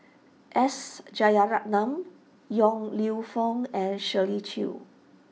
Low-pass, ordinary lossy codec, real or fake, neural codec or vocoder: none; none; real; none